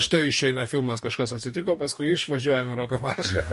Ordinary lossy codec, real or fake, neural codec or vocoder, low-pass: MP3, 48 kbps; fake; codec, 44.1 kHz, 2.6 kbps, DAC; 14.4 kHz